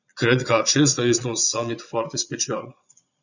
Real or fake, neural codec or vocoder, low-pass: fake; vocoder, 22.05 kHz, 80 mel bands, Vocos; 7.2 kHz